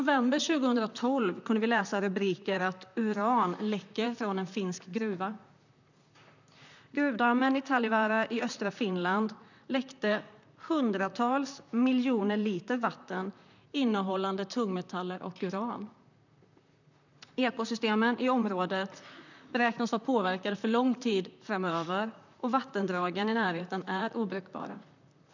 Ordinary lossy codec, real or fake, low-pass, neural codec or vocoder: none; fake; 7.2 kHz; vocoder, 44.1 kHz, 128 mel bands, Pupu-Vocoder